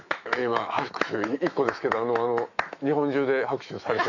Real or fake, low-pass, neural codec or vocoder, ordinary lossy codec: fake; 7.2 kHz; autoencoder, 48 kHz, 128 numbers a frame, DAC-VAE, trained on Japanese speech; none